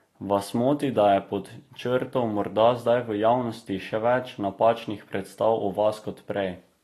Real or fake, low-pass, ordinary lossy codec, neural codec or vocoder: real; 14.4 kHz; AAC, 48 kbps; none